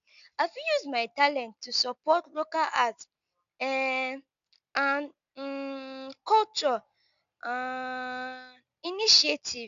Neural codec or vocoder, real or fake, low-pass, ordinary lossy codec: none; real; 7.2 kHz; none